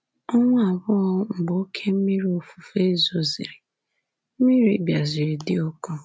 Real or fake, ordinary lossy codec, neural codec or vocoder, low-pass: real; none; none; none